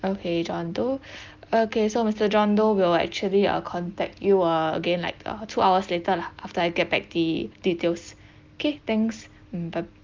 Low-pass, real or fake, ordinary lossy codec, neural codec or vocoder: 7.2 kHz; real; Opus, 32 kbps; none